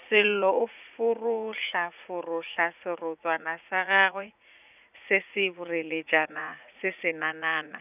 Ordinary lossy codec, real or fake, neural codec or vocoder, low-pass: none; fake; vocoder, 44.1 kHz, 80 mel bands, Vocos; 3.6 kHz